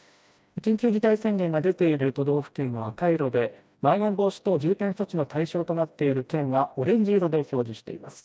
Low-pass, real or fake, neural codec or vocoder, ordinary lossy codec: none; fake; codec, 16 kHz, 1 kbps, FreqCodec, smaller model; none